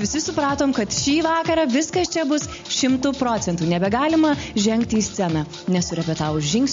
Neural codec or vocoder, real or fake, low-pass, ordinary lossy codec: none; real; 7.2 kHz; MP3, 48 kbps